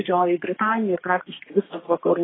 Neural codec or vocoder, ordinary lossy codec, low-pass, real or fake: codec, 32 kHz, 1.9 kbps, SNAC; AAC, 16 kbps; 7.2 kHz; fake